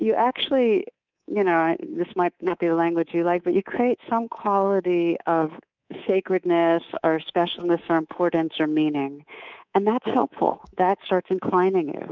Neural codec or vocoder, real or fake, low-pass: codec, 24 kHz, 3.1 kbps, DualCodec; fake; 7.2 kHz